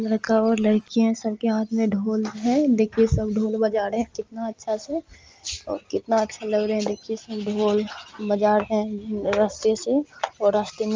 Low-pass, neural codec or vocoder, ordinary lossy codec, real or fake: 7.2 kHz; none; Opus, 24 kbps; real